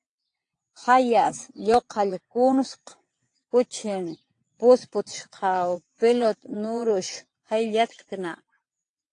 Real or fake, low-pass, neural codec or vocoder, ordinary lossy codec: fake; 9.9 kHz; vocoder, 22.05 kHz, 80 mel bands, WaveNeXt; AAC, 48 kbps